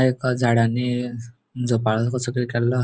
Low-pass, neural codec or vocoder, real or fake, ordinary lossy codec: none; none; real; none